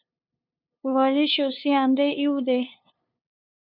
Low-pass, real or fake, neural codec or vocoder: 5.4 kHz; fake; codec, 16 kHz, 2 kbps, FunCodec, trained on LibriTTS, 25 frames a second